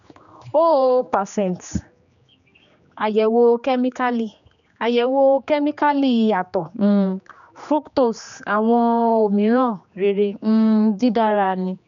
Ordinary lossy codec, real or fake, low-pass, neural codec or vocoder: none; fake; 7.2 kHz; codec, 16 kHz, 2 kbps, X-Codec, HuBERT features, trained on general audio